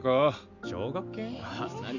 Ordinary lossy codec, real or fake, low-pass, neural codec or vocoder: none; real; 7.2 kHz; none